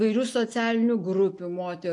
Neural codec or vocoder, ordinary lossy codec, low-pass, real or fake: none; AAC, 64 kbps; 10.8 kHz; real